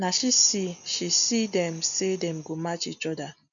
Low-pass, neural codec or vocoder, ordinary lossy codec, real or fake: 7.2 kHz; none; none; real